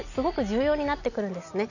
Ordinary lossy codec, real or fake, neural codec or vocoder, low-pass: none; real; none; 7.2 kHz